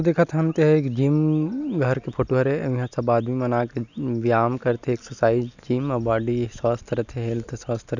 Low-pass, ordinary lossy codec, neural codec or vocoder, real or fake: 7.2 kHz; none; none; real